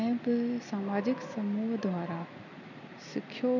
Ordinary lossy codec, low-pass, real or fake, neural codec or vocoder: none; 7.2 kHz; real; none